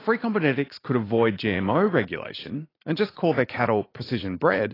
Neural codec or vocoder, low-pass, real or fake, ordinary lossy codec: vocoder, 44.1 kHz, 80 mel bands, Vocos; 5.4 kHz; fake; AAC, 24 kbps